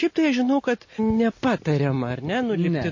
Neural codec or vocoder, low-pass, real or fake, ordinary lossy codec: none; 7.2 kHz; real; MP3, 32 kbps